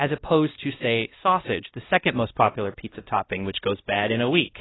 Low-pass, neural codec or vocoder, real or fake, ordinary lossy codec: 7.2 kHz; codec, 16 kHz, 1 kbps, X-Codec, WavLM features, trained on Multilingual LibriSpeech; fake; AAC, 16 kbps